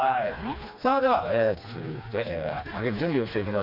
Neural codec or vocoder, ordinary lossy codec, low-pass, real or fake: codec, 16 kHz, 2 kbps, FreqCodec, smaller model; none; 5.4 kHz; fake